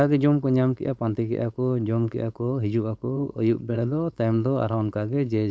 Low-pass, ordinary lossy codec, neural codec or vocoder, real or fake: none; none; codec, 16 kHz, 4.8 kbps, FACodec; fake